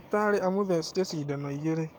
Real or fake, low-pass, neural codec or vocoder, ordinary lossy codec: fake; none; codec, 44.1 kHz, 7.8 kbps, DAC; none